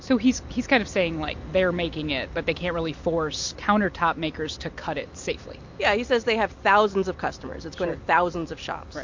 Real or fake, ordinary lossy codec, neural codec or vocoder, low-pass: real; MP3, 48 kbps; none; 7.2 kHz